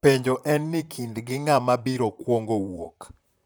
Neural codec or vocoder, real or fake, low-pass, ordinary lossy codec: vocoder, 44.1 kHz, 128 mel bands, Pupu-Vocoder; fake; none; none